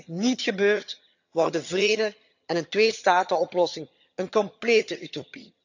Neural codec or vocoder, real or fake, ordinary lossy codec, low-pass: vocoder, 22.05 kHz, 80 mel bands, HiFi-GAN; fake; none; 7.2 kHz